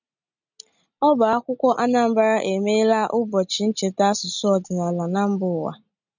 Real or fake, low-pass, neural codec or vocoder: real; 7.2 kHz; none